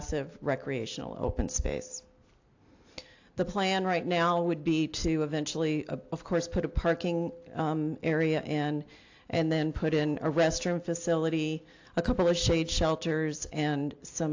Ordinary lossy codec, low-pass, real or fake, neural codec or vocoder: AAC, 48 kbps; 7.2 kHz; real; none